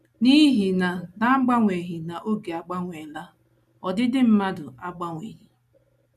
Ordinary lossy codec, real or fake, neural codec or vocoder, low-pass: none; real; none; 14.4 kHz